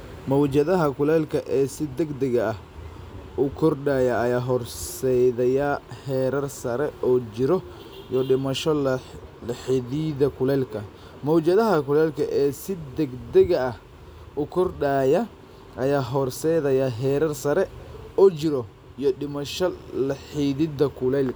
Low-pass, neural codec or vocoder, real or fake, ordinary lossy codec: none; none; real; none